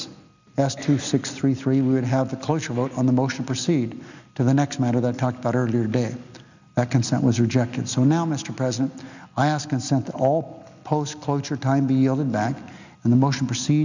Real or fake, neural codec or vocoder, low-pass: real; none; 7.2 kHz